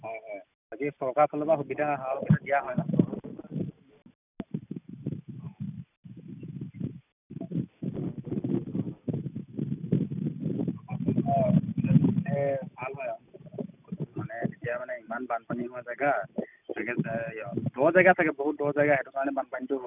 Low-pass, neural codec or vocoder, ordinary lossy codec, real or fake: 3.6 kHz; none; none; real